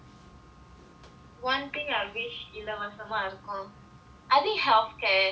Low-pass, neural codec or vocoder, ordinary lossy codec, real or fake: none; none; none; real